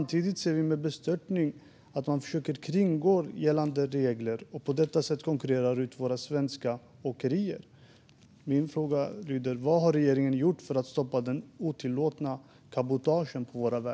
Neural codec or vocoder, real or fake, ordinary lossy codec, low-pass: none; real; none; none